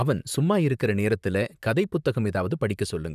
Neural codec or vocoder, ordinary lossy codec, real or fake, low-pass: vocoder, 44.1 kHz, 128 mel bands, Pupu-Vocoder; none; fake; 14.4 kHz